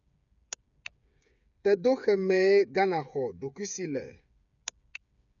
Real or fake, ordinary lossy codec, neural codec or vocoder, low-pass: fake; none; codec, 16 kHz, 8 kbps, FreqCodec, smaller model; 7.2 kHz